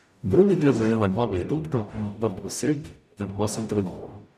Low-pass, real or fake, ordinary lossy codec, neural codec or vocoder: 14.4 kHz; fake; none; codec, 44.1 kHz, 0.9 kbps, DAC